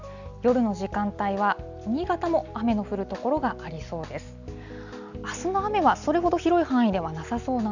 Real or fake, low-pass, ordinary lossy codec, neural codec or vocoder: real; 7.2 kHz; none; none